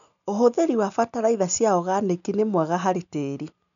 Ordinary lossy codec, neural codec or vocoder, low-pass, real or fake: none; none; 7.2 kHz; real